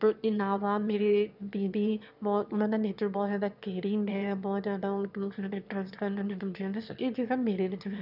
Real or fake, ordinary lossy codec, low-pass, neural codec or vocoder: fake; none; 5.4 kHz; autoencoder, 22.05 kHz, a latent of 192 numbers a frame, VITS, trained on one speaker